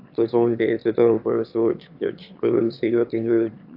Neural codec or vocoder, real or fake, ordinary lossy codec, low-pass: autoencoder, 22.05 kHz, a latent of 192 numbers a frame, VITS, trained on one speaker; fake; AAC, 48 kbps; 5.4 kHz